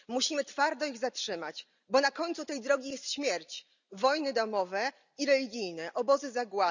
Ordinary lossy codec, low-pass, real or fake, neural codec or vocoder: none; 7.2 kHz; real; none